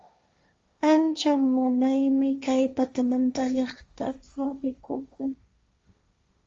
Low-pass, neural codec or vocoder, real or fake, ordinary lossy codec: 7.2 kHz; codec, 16 kHz, 1.1 kbps, Voila-Tokenizer; fake; Opus, 32 kbps